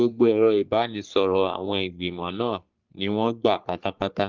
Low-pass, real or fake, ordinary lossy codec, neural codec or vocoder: 7.2 kHz; fake; Opus, 24 kbps; codec, 44.1 kHz, 3.4 kbps, Pupu-Codec